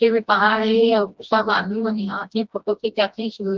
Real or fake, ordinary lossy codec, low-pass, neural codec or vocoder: fake; Opus, 32 kbps; 7.2 kHz; codec, 16 kHz, 1 kbps, FreqCodec, smaller model